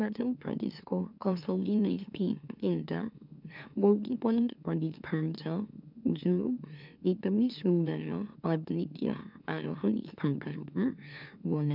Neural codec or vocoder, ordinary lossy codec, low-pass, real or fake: autoencoder, 44.1 kHz, a latent of 192 numbers a frame, MeloTTS; none; 5.4 kHz; fake